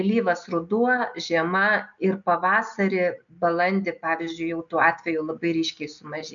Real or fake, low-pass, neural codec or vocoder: real; 7.2 kHz; none